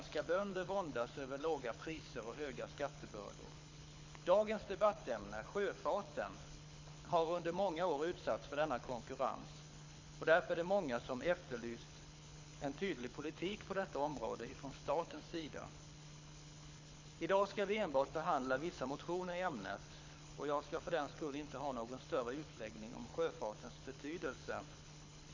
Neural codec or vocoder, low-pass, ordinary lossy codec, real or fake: codec, 24 kHz, 6 kbps, HILCodec; 7.2 kHz; MP3, 48 kbps; fake